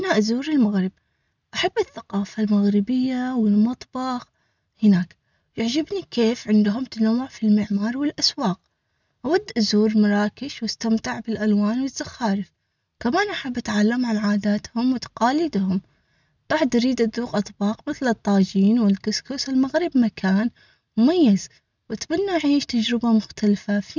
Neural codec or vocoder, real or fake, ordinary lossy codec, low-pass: none; real; none; 7.2 kHz